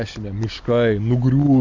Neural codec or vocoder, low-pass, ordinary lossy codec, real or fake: none; 7.2 kHz; MP3, 64 kbps; real